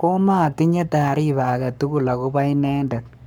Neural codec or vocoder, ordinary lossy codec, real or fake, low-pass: codec, 44.1 kHz, 7.8 kbps, Pupu-Codec; none; fake; none